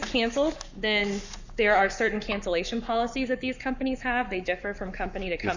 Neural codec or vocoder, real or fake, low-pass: codec, 44.1 kHz, 7.8 kbps, Pupu-Codec; fake; 7.2 kHz